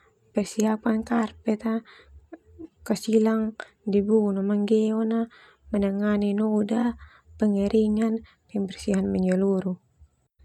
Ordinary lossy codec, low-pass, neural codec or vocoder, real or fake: none; 19.8 kHz; none; real